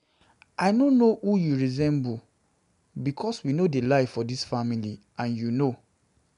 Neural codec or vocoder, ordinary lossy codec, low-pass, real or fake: none; none; 10.8 kHz; real